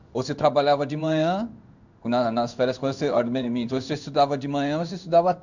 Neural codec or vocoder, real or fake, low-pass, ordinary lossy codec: codec, 16 kHz in and 24 kHz out, 1 kbps, XY-Tokenizer; fake; 7.2 kHz; none